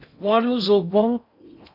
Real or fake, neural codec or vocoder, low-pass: fake; codec, 16 kHz in and 24 kHz out, 0.6 kbps, FocalCodec, streaming, 4096 codes; 5.4 kHz